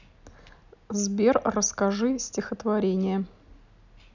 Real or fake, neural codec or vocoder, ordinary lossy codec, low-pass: real; none; none; 7.2 kHz